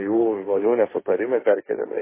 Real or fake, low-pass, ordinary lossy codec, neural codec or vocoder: fake; 3.6 kHz; MP3, 16 kbps; codec, 16 kHz, 1.1 kbps, Voila-Tokenizer